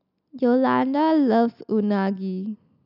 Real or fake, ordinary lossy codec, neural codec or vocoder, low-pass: real; none; none; 5.4 kHz